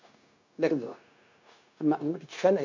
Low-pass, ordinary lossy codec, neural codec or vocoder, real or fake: 7.2 kHz; MP3, 64 kbps; codec, 16 kHz, 0.9 kbps, LongCat-Audio-Codec; fake